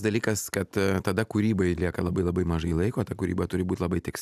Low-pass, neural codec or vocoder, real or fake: 14.4 kHz; none; real